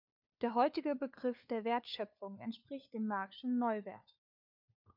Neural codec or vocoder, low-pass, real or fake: codec, 16 kHz, 4 kbps, FunCodec, trained on LibriTTS, 50 frames a second; 5.4 kHz; fake